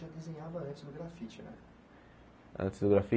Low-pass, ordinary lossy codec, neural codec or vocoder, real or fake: none; none; none; real